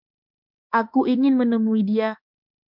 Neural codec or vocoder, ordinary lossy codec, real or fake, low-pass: autoencoder, 48 kHz, 32 numbers a frame, DAC-VAE, trained on Japanese speech; MP3, 48 kbps; fake; 5.4 kHz